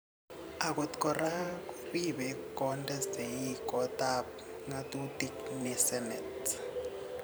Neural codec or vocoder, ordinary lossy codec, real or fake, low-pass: vocoder, 44.1 kHz, 128 mel bands every 512 samples, BigVGAN v2; none; fake; none